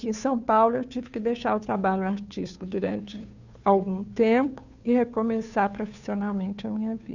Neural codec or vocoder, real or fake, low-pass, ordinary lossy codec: codec, 16 kHz, 2 kbps, FunCodec, trained on Chinese and English, 25 frames a second; fake; 7.2 kHz; none